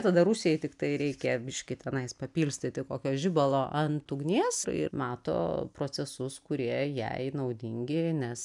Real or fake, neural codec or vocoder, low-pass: real; none; 10.8 kHz